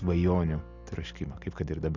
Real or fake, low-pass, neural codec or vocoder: real; 7.2 kHz; none